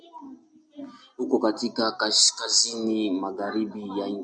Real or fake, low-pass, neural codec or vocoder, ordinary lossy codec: real; 9.9 kHz; none; MP3, 96 kbps